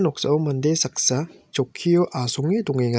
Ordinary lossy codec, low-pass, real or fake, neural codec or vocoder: none; none; real; none